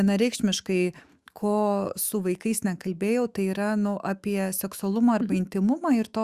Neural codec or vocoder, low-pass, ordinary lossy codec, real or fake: autoencoder, 48 kHz, 128 numbers a frame, DAC-VAE, trained on Japanese speech; 14.4 kHz; Opus, 64 kbps; fake